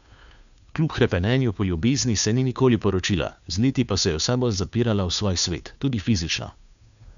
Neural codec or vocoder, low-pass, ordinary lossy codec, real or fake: codec, 16 kHz, 2 kbps, FunCodec, trained on Chinese and English, 25 frames a second; 7.2 kHz; none; fake